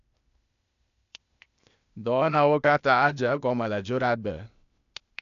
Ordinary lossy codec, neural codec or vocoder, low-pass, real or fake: none; codec, 16 kHz, 0.8 kbps, ZipCodec; 7.2 kHz; fake